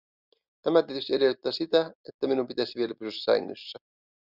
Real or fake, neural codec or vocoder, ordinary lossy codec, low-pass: real; none; Opus, 64 kbps; 5.4 kHz